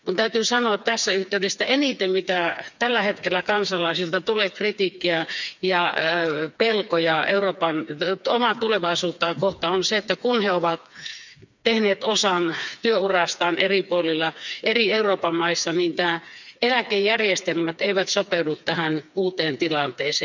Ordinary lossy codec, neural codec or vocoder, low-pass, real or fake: none; codec, 16 kHz, 4 kbps, FreqCodec, smaller model; 7.2 kHz; fake